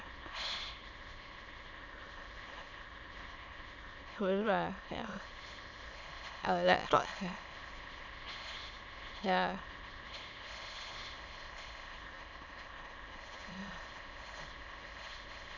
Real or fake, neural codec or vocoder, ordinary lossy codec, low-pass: fake; autoencoder, 22.05 kHz, a latent of 192 numbers a frame, VITS, trained on many speakers; none; 7.2 kHz